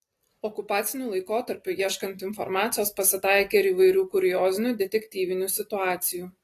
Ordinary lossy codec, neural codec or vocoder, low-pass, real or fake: AAC, 48 kbps; none; 14.4 kHz; real